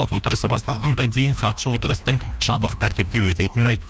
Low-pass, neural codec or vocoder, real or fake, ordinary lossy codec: none; codec, 16 kHz, 1 kbps, FreqCodec, larger model; fake; none